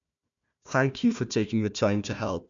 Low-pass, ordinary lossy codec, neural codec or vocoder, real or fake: 7.2 kHz; MP3, 64 kbps; codec, 16 kHz, 1 kbps, FunCodec, trained on Chinese and English, 50 frames a second; fake